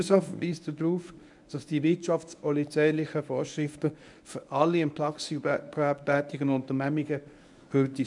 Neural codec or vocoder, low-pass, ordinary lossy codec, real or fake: codec, 24 kHz, 0.9 kbps, WavTokenizer, medium speech release version 1; 10.8 kHz; none; fake